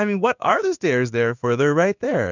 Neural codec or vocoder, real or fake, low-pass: codec, 16 kHz in and 24 kHz out, 1 kbps, XY-Tokenizer; fake; 7.2 kHz